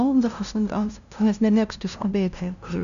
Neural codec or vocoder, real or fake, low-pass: codec, 16 kHz, 0.5 kbps, FunCodec, trained on LibriTTS, 25 frames a second; fake; 7.2 kHz